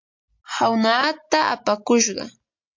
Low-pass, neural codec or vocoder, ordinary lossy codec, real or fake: 7.2 kHz; none; MP3, 48 kbps; real